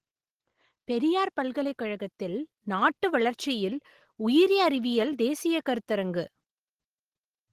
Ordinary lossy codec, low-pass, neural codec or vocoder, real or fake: Opus, 16 kbps; 14.4 kHz; none; real